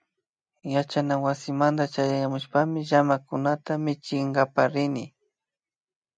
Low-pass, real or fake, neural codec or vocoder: 9.9 kHz; real; none